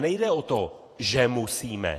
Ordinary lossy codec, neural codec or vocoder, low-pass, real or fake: AAC, 48 kbps; vocoder, 48 kHz, 128 mel bands, Vocos; 14.4 kHz; fake